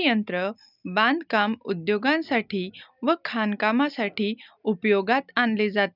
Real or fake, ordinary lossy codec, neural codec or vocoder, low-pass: real; none; none; 5.4 kHz